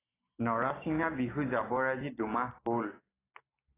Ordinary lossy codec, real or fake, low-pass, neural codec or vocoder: AAC, 16 kbps; real; 3.6 kHz; none